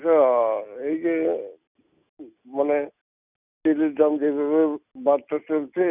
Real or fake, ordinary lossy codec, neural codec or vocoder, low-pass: real; none; none; 3.6 kHz